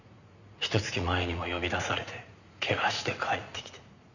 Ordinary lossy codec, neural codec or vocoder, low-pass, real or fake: none; vocoder, 44.1 kHz, 80 mel bands, Vocos; 7.2 kHz; fake